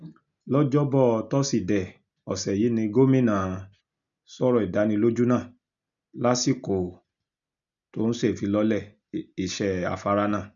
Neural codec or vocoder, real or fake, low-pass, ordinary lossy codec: none; real; 7.2 kHz; none